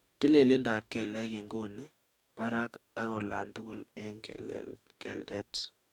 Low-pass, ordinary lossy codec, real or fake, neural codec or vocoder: 19.8 kHz; none; fake; codec, 44.1 kHz, 2.6 kbps, DAC